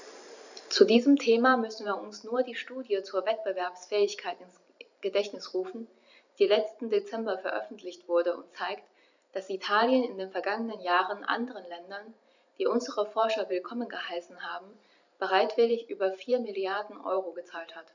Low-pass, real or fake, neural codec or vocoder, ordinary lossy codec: 7.2 kHz; fake; vocoder, 44.1 kHz, 128 mel bands every 512 samples, BigVGAN v2; none